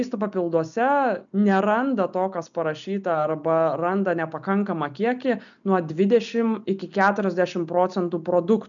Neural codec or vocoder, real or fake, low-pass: none; real; 7.2 kHz